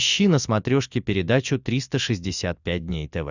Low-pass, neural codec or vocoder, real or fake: 7.2 kHz; none; real